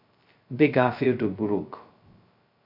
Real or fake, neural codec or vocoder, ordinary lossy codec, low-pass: fake; codec, 16 kHz, 0.2 kbps, FocalCodec; MP3, 48 kbps; 5.4 kHz